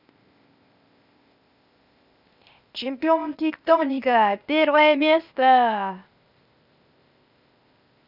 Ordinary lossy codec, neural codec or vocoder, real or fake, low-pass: none; codec, 16 kHz, 0.8 kbps, ZipCodec; fake; 5.4 kHz